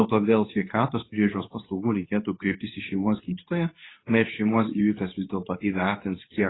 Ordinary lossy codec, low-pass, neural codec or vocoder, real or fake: AAC, 16 kbps; 7.2 kHz; codec, 24 kHz, 0.9 kbps, WavTokenizer, medium speech release version 2; fake